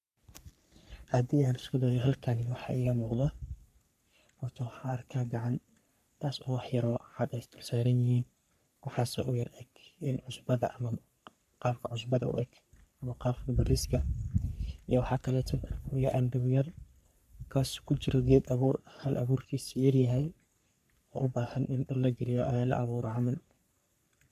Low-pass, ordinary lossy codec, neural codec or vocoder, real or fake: 14.4 kHz; none; codec, 44.1 kHz, 3.4 kbps, Pupu-Codec; fake